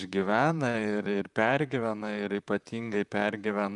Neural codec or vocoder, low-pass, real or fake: vocoder, 44.1 kHz, 128 mel bands, Pupu-Vocoder; 10.8 kHz; fake